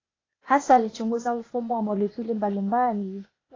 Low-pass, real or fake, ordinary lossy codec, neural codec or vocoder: 7.2 kHz; fake; AAC, 32 kbps; codec, 16 kHz, 0.8 kbps, ZipCodec